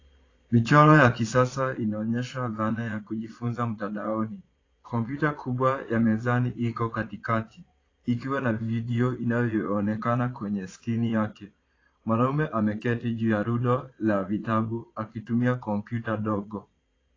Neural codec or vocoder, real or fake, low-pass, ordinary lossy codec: vocoder, 22.05 kHz, 80 mel bands, Vocos; fake; 7.2 kHz; AAC, 32 kbps